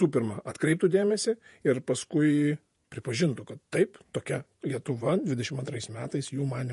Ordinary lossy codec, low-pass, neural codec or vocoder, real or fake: MP3, 48 kbps; 14.4 kHz; vocoder, 48 kHz, 128 mel bands, Vocos; fake